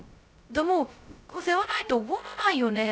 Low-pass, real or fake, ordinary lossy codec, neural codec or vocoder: none; fake; none; codec, 16 kHz, 0.2 kbps, FocalCodec